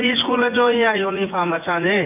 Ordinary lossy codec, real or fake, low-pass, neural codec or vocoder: none; fake; 3.6 kHz; vocoder, 24 kHz, 100 mel bands, Vocos